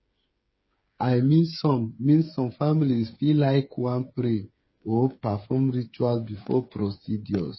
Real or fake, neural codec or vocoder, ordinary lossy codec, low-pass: fake; codec, 16 kHz, 8 kbps, FreqCodec, smaller model; MP3, 24 kbps; 7.2 kHz